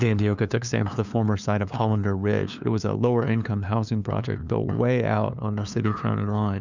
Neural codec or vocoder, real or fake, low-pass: codec, 16 kHz, 2 kbps, FunCodec, trained on LibriTTS, 25 frames a second; fake; 7.2 kHz